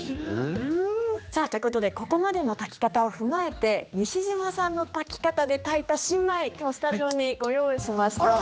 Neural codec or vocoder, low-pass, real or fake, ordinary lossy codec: codec, 16 kHz, 2 kbps, X-Codec, HuBERT features, trained on general audio; none; fake; none